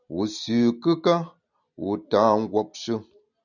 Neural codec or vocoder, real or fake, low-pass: none; real; 7.2 kHz